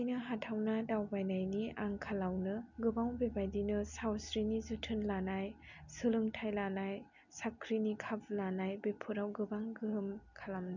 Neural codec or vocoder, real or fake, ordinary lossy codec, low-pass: none; real; none; 7.2 kHz